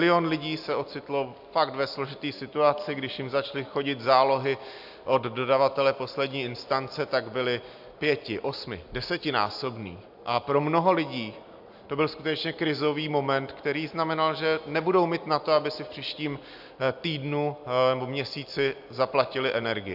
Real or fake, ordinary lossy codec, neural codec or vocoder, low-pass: real; AAC, 48 kbps; none; 5.4 kHz